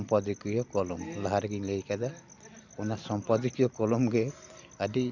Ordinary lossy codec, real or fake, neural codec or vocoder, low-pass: none; real; none; 7.2 kHz